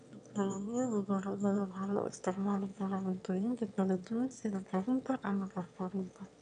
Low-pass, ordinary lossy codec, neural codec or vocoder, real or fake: 9.9 kHz; none; autoencoder, 22.05 kHz, a latent of 192 numbers a frame, VITS, trained on one speaker; fake